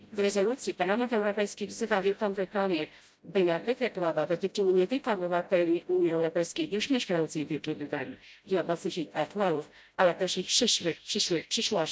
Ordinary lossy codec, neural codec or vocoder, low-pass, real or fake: none; codec, 16 kHz, 0.5 kbps, FreqCodec, smaller model; none; fake